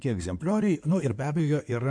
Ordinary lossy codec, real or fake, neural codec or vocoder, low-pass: MP3, 64 kbps; fake; codec, 16 kHz in and 24 kHz out, 2.2 kbps, FireRedTTS-2 codec; 9.9 kHz